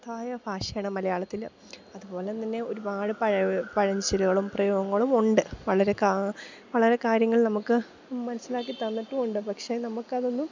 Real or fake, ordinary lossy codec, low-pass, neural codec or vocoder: real; MP3, 64 kbps; 7.2 kHz; none